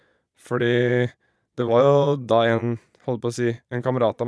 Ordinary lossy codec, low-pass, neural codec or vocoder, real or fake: none; none; vocoder, 22.05 kHz, 80 mel bands, Vocos; fake